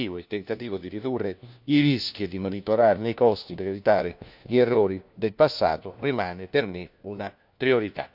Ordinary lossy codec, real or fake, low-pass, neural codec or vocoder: none; fake; 5.4 kHz; codec, 16 kHz, 1 kbps, FunCodec, trained on LibriTTS, 50 frames a second